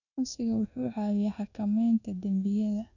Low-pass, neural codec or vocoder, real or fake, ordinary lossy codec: 7.2 kHz; codec, 24 kHz, 1.2 kbps, DualCodec; fake; none